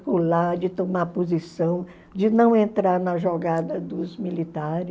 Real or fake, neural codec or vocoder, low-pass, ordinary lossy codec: real; none; none; none